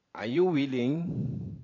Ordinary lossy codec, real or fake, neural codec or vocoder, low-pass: AAC, 32 kbps; fake; vocoder, 44.1 kHz, 128 mel bands every 512 samples, BigVGAN v2; 7.2 kHz